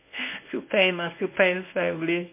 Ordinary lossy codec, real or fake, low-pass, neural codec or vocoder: MP3, 24 kbps; fake; 3.6 kHz; codec, 24 kHz, 0.9 kbps, WavTokenizer, medium speech release version 1